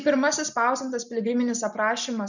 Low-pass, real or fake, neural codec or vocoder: 7.2 kHz; real; none